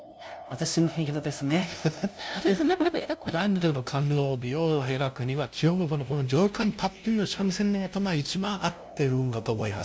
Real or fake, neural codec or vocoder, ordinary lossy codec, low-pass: fake; codec, 16 kHz, 0.5 kbps, FunCodec, trained on LibriTTS, 25 frames a second; none; none